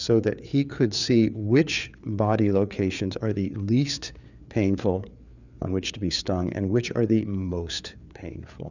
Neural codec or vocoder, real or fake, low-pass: codec, 16 kHz, 4 kbps, FreqCodec, larger model; fake; 7.2 kHz